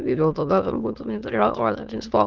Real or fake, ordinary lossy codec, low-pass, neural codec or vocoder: fake; Opus, 16 kbps; 7.2 kHz; autoencoder, 22.05 kHz, a latent of 192 numbers a frame, VITS, trained on many speakers